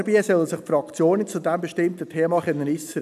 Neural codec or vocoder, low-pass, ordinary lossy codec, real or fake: none; 14.4 kHz; none; real